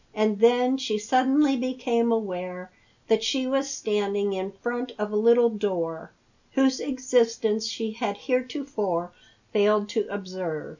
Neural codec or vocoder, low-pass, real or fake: none; 7.2 kHz; real